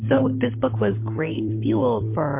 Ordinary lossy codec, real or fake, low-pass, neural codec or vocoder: MP3, 24 kbps; fake; 3.6 kHz; codec, 16 kHz, 16 kbps, FunCodec, trained on LibriTTS, 50 frames a second